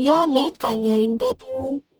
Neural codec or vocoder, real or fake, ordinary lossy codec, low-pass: codec, 44.1 kHz, 0.9 kbps, DAC; fake; none; none